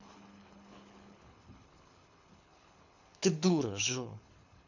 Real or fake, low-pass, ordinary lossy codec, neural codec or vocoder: fake; 7.2 kHz; none; codec, 24 kHz, 6 kbps, HILCodec